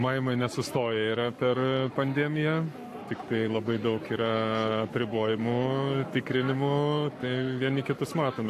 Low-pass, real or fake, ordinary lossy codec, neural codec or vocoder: 14.4 kHz; fake; AAC, 64 kbps; codec, 44.1 kHz, 7.8 kbps, Pupu-Codec